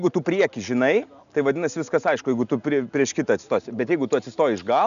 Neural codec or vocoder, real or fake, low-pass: none; real; 7.2 kHz